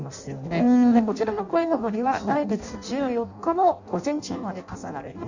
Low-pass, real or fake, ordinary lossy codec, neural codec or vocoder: 7.2 kHz; fake; Opus, 64 kbps; codec, 16 kHz in and 24 kHz out, 0.6 kbps, FireRedTTS-2 codec